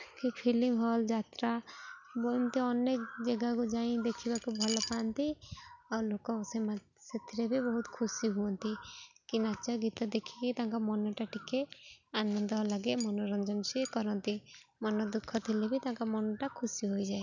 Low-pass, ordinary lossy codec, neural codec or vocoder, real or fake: 7.2 kHz; none; none; real